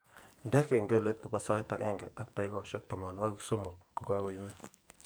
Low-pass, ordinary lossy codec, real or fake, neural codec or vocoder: none; none; fake; codec, 44.1 kHz, 2.6 kbps, SNAC